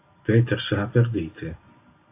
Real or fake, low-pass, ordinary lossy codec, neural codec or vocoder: real; 3.6 kHz; AAC, 24 kbps; none